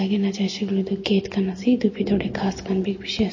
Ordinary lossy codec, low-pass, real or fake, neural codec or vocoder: MP3, 32 kbps; 7.2 kHz; real; none